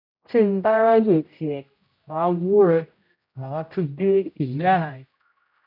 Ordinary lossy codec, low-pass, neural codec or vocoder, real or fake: none; 5.4 kHz; codec, 16 kHz, 0.5 kbps, X-Codec, HuBERT features, trained on general audio; fake